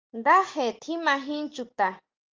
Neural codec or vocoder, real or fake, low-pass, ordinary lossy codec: none; real; 7.2 kHz; Opus, 32 kbps